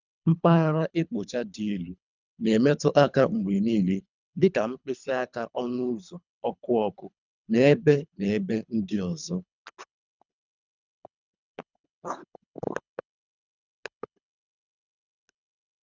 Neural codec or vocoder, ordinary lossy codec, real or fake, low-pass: codec, 24 kHz, 3 kbps, HILCodec; none; fake; 7.2 kHz